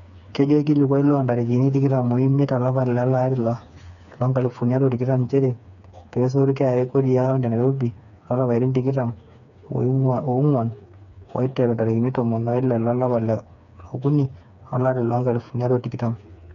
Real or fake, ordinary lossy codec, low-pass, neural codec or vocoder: fake; none; 7.2 kHz; codec, 16 kHz, 4 kbps, FreqCodec, smaller model